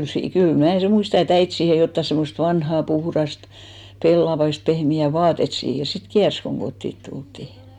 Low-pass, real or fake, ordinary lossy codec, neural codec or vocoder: 19.8 kHz; real; none; none